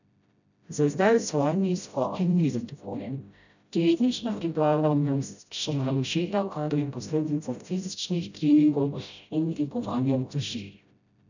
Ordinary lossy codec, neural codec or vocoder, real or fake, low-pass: none; codec, 16 kHz, 0.5 kbps, FreqCodec, smaller model; fake; 7.2 kHz